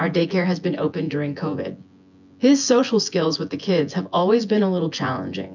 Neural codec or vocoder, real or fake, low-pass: vocoder, 24 kHz, 100 mel bands, Vocos; fake; 7.2 kHz